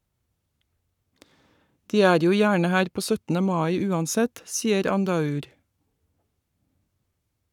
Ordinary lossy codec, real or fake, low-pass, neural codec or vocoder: none; fake; 19.8 kHz; codec, 44.1 kHz, 7.8 kbps, Pupu-Codec